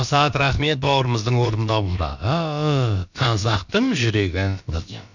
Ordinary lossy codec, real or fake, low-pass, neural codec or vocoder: none; fake; 7.2 kHz; codec, 16 kHz, about 1 kbps, DyCAST, with the encoder's durations